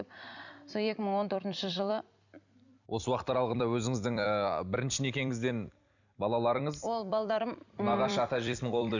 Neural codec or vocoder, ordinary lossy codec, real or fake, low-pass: vocoder, 44.1 kHz, 128 mel bands every 256 samples, BigVGAN v2; none; fake; 7.2 kHz